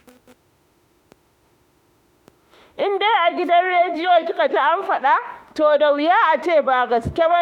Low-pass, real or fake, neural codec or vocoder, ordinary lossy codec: 19.8 kHz; fake; autoencoder, 48 kHz, 32 numbers a frame, DAC-VAE, trained on Japanese speech; none